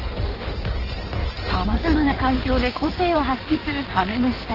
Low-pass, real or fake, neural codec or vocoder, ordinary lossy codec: 5.4 kHz; fake; codec, 16 kHz in and 24 kHz out, 1.1 kbps, FireRedTTS-2 codec; Opus, 16 kbps